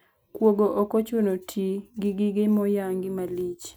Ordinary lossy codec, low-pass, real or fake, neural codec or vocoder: none; none; real; none